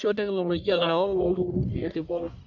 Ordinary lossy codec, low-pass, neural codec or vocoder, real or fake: none; 7.2 kHz; codec, 44.1 kHz, 1.7 kbps, Pupu-Codec; fake